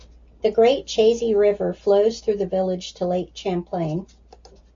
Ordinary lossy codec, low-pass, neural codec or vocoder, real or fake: MP3, 48 kbps; 7.2 kHz; none; real